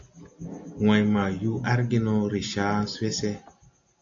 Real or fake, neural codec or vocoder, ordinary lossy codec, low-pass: real; none; AAC, 64 kbps; 7.2 kHz